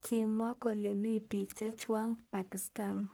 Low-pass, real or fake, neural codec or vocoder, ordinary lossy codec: none; fake; codec, 44.1 kHz, 1.7 kbps, Pupu-Codec; none